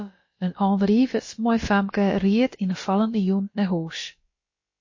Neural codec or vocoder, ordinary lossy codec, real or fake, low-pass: codec, 16 kHz, about 1 kbps, DyCAST, with the encoder's durations; MP3, 32 kbps; fake; 7.2 kHz